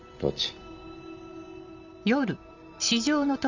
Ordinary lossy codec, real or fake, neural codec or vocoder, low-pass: Opus, 64 kbps; real; none; 7.2 kHz